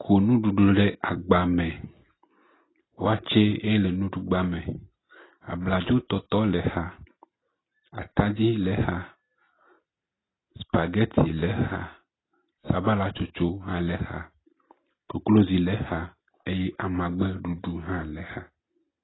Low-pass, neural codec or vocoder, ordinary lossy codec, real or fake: 7.2 kHz; none; AAC, 16 kbps; real